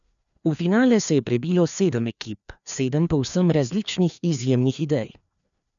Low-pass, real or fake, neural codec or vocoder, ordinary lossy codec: 7.2 kHz; fake; codec, 16 kHz, 2 kbps, FreqCodec, larger model; none